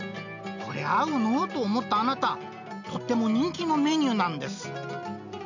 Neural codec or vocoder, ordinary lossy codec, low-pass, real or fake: none; none; 7.2 kHz; real